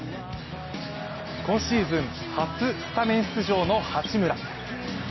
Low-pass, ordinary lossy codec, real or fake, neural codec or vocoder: 7.2 kHz; MP3, 24 kbps; real; none